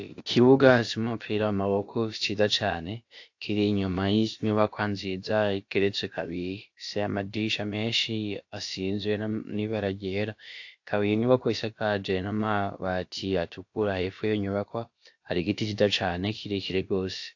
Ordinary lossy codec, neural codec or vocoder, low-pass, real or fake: AAC, 48 kbps; codec, 16 kHz, about 1 kbps, DyCAST, with the encoder's durations; 7.2 kHz; fake